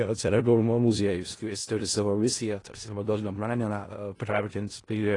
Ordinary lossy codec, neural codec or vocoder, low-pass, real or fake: AAC, 32 kbps; codec, 16 kHz in and 24 kHz out, 0.4 kbps, LongCat-Audio-Codec, four codebook decoder; 10.8 kHz; fake